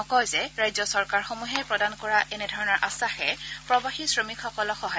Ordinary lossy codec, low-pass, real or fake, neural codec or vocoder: none; none; real; none